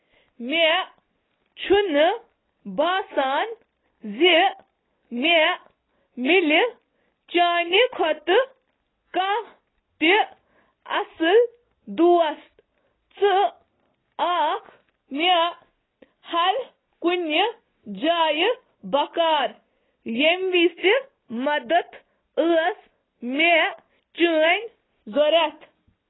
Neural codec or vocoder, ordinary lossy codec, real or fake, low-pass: none; AAC, 16 kbps; real; 7.2 kHz